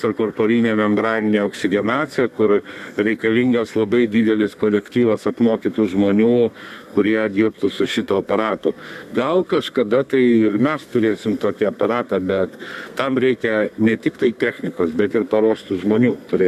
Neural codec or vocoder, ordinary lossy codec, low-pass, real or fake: codec, 32 kHz, 1.9 kbps, SNAC; MP3, 96 kbps; 14.4 kHz; fake